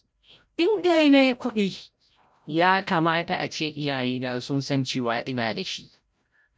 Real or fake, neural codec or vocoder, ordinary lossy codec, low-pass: fake; codec, 16 kHz, 0.5 kbps, FreqCodec, larger model; none; none